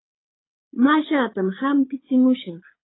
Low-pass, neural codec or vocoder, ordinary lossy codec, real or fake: 7.2 kHz; codec, 24 kHz, 6 kbps, HILCodec; AAC, 16 kbps; fake